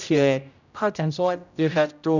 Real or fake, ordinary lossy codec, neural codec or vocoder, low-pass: fake; none; codec, 16 kHz, 0.5 kbps, X-Codec, HuBERT features, trained on general audio; 7.2 kHz